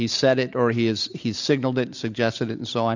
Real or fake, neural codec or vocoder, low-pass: real; none; 7.2 kHz